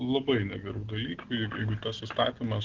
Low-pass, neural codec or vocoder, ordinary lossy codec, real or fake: 7.2 kHz; none; Opus, 16 kbps; real